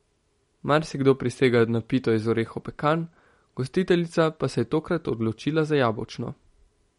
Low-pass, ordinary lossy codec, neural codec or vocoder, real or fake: 19.8 kHz; MP3, 48 kbps; none; real